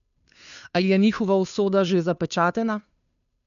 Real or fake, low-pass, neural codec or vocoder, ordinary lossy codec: fake; 7.2 kHz; codec, 16 kHz, 2 kbps, FunCodec, trained on Chinese and English, 25 frames a second; none